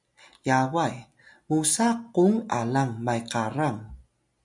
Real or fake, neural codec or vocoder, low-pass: real; none; 10.8 kHz